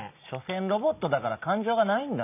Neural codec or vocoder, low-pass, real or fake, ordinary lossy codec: none; 3.6 kHz; real; none